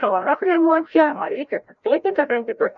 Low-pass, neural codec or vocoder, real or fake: 7.2 kHz; codec, 16 kHz, 0.5 kbps, FreqCodec, larger model; fake